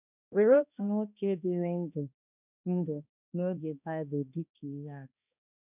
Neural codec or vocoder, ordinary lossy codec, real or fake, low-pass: codec, 16 kHz, 1 kbps, X-Codec, HuBERT features, trained on balanced general audio; none; fake; 3.6 kHz